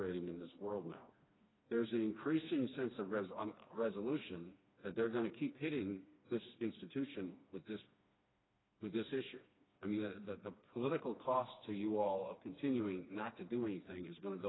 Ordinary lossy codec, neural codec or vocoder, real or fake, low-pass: AAC, 16 kbps; codec, 16 kHz, 2 kbps, FreqCodec, smaller model; fake; 7.2 kHz